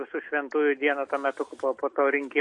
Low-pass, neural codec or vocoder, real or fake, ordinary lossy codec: 10.8 kHz; none; real; MP3, 48 kbps